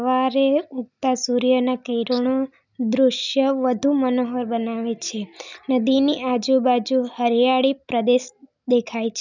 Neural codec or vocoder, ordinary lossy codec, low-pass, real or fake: none; none; 7.2 kHz; real